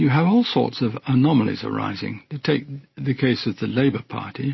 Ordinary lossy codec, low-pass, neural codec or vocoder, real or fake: MP3, 24 kbps; 7.2 kHz; none; real